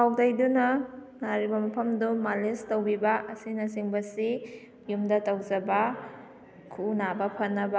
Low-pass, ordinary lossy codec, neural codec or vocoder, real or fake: none; none; none; real